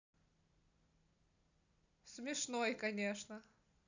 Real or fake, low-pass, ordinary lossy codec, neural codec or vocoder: real; 7.2 kHz; none; none